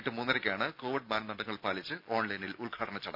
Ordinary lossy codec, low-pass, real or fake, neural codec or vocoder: none; 5.4 kHz; real; none